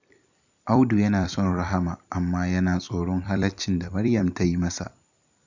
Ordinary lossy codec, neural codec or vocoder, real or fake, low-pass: none; vocoder, 44.1 kHz, 128 mel bands every 256 samples, BigVGAN v2; fake; 7.2 kHz